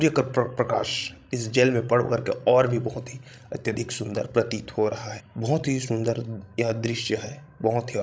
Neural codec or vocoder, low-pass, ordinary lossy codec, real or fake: codec, 16 kHz, 16 kbps, FreqCodec, larger model; none; none; fake